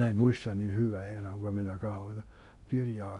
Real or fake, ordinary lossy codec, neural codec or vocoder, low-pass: fake; none; codec, 16 kHz in and 24 kHz out, 0.6 kbps, FocalCodec, streaming, 2048 codes; 10.8 kHz